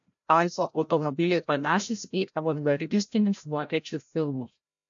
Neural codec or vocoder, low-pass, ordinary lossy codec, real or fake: codec, 16 kHz, 0.5 kbps, FreqCodec, larger model; 7.2 kHz; AAC, 64 kbps; fake